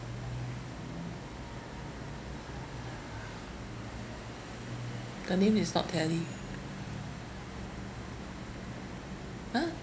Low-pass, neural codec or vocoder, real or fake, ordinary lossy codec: none; none; real; none